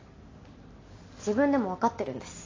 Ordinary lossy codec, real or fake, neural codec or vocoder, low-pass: AAC, 32 kbps; real; none; 7.2 kHz